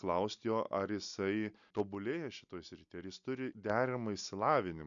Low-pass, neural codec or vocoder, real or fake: 7.2 kHz; none; real